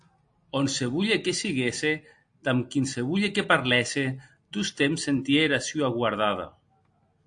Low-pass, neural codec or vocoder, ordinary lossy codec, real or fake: 9.9 kHz; none; AAC, 64 kbps; real